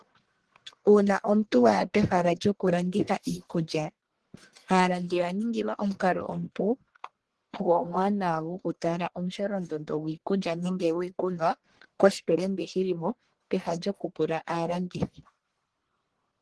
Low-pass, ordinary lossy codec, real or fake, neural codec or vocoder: 10.8 kHz; Opus, 16 kbps; fake; codec, 44.1 kHz, 1.7 kbps, Pupu-Codec